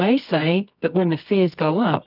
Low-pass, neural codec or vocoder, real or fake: 5.4 kHz; codec, 24 kHz, 0.9 kbps, WavTokenizer, medium music audio release; fake